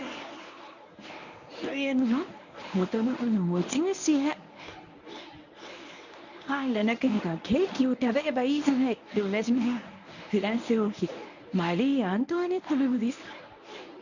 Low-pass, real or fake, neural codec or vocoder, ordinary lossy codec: 7.2 kHz; fake; codec, 24 kHz, 0.9 kbps, WavTokenizer, medium speech release version 1; none